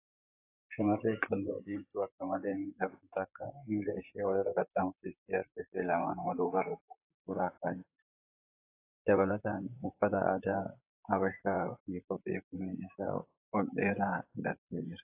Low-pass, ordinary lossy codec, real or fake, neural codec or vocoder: 3.6 kHz; AAC, 24 kbps; fake; vocoder, 44.1 kHz, 128 mel bands, Pupu-Vocoder